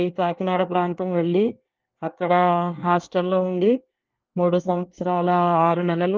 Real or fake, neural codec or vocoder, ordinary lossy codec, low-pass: fake; codec, 24 kHz, 1 kbps, SNAC; Opus, 32 kbps; 7.2 kHz